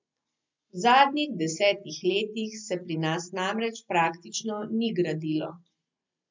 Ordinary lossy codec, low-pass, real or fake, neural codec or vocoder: MP3, 64 kbps; 7.2 kHz; real; none